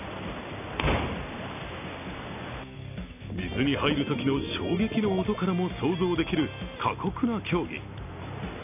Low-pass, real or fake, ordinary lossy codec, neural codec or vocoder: 3.6 kHz; real; none; none